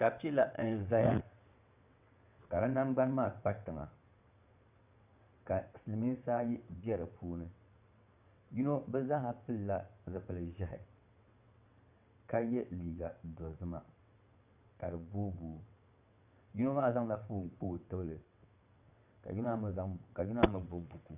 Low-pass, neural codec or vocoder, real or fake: 3.6 kHz; vocoder, 22.05 kHz, 80 mel bands, WaveNeXt; fake